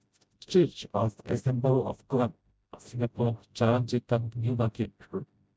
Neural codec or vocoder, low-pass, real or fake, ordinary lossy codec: codec, 16 kHz, 0.5 kbps, FreqCodec, smaller model; none; fake; none